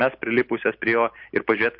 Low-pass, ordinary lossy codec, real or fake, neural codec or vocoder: 5.4 kHz; MP3, 48 kbps; real; none